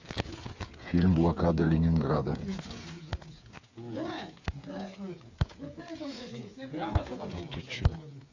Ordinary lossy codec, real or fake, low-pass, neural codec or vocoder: AAC, 48 kbps; fake; 7.2 kHz; codec, 16 kHz, 8 kbps, FreqCodec, smaller model